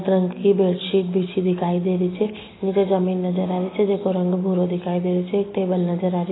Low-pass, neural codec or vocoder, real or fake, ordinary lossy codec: 7.2 kHz; none; real; AAC, 16 kbps